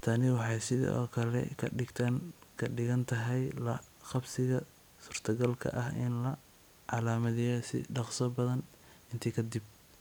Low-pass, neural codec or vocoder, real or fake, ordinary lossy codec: none; none; real; none